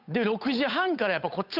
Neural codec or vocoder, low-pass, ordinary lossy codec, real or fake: codec, 16 kHz, 8 kbps, FunCodec, trained on Chinese and English, 25 frames a second; 5.4 kHz; none; fake